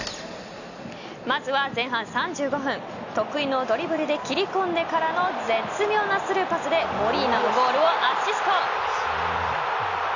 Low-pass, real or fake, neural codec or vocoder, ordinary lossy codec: 7.2 kHz; real; none; none